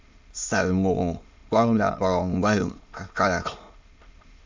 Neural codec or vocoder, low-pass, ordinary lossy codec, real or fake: autoencoder, 22.05 kHz, a latent of 192 numbers a frame, VITS, trained on many speakers; 7.2 kHz; MP3, 64 kbps; fake